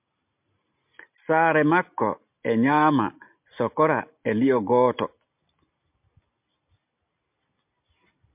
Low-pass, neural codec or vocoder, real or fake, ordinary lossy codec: 3.6 kHz; none; real; MP3, 32 kbps